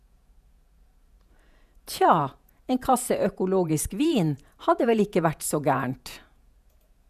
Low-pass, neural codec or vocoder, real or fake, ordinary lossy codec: 14.4 kHz; vocoder, 48 kHz, 128 mel bands, Vocos; fake; none